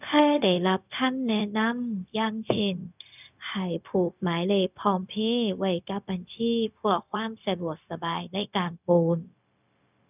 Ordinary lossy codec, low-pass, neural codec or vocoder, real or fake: none; 3.6 kHz; codec, 16 kHz, 0.4 kbps, LongCat-Audio-Codec; fake